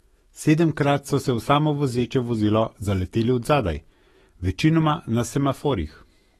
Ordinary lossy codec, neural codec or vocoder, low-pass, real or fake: AAC, 32 kbps; autoencoder, 48 kHz, 128 numbers a frame, DAC-VAE, trained on Japanese speech; 19.8 kHz; fake